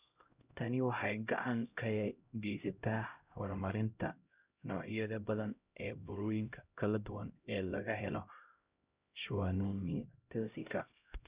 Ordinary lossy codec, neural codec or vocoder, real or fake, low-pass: Opus, 64 kbps; codec, 16 kHz, 0.5 kbps, X-Codec, HuBERT features, trained on LibriSpeech; fake; 3.6 kHz